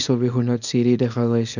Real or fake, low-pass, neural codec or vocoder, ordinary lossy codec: fake; 7.2 kHz; codec, 24 kHz, 0.9 kbps, WavTokenizer, small release; none